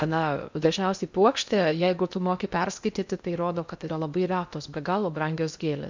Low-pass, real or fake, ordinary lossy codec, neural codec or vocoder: 7.2 kHz; fake; MP3, 64 kbps; codec, 16 kHz in and 24 kHz out, 0.6 kbps, FocalCodec, streaming, 2048 codes